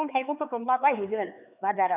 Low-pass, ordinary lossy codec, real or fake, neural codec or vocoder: 3.6 kHz; none; fake; codec, 16 kHz, 2 kbps, X-Codec, HuBERT features, trained on LibriSpeech